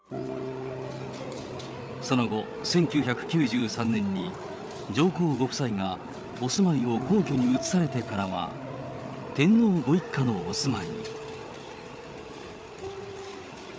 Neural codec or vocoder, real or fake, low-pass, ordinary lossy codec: codec, 16 kHz, 8 kbps, FreqCodec, larger model; fake; none; none